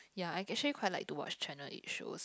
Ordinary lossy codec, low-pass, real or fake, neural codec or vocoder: none; none; real; none